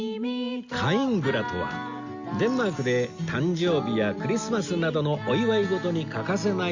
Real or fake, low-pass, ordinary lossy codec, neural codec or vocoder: real; 7.2 kHz; Opus, 64 kbps; none